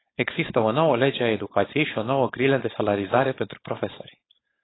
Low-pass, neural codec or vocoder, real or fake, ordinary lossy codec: 7.2 kHz; codec, 16 kHz, 4.8 kbps, FACodec; fake; AAC, 16 kbps